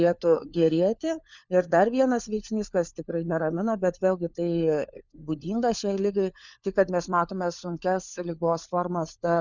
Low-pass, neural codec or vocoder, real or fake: 7.2 kHz; codec, 16 kHz, 4 kbps, FunCodec, trained on LibriTTS, 50 frames a second; fake